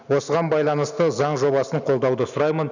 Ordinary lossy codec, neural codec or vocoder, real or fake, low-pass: none; none; real; 7.2 kHz